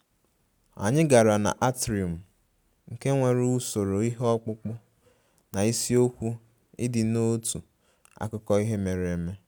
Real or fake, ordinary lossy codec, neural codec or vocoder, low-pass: real; none; none; none